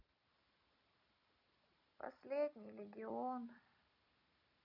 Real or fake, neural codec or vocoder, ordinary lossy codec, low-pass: real; none; none; 5.4 kHz